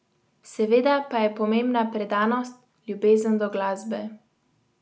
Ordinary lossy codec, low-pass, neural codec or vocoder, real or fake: none; none; none; real